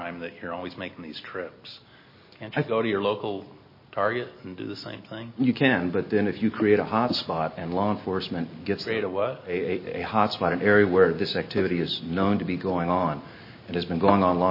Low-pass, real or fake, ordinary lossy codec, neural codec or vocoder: 5.4 kHz; real; MP3, 24 kbps; none